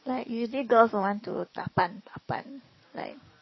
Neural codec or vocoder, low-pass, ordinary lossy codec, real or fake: codec, 44.1 kHz, 7.8 kbps, DAC; 7.2 kHz; MP3, 24 kbps; fake